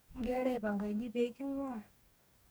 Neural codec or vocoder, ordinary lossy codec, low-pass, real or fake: codec, 44.1 kHz, 2.6 kbps, DAC; none; none; fake